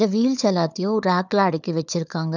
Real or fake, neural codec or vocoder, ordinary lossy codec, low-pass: fake; codec, 16 kHz, 4 kbps, FunCodec, trained on Chinese and English, 50 frames a second; none; 7.2 kHz